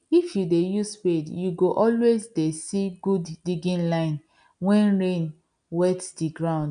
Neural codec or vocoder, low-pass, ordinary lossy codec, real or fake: none; 9.9 kHz; none; real